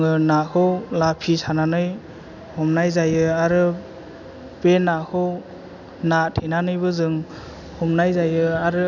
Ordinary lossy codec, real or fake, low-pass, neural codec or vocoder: none; real; 7.2 kHz; none